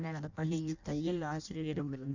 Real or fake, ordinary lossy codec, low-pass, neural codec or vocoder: fake; none; 7.2 kHz; codec, 16 kHz in and 24 kHz out, 0.6 kbps, FireRedTTS-2 codec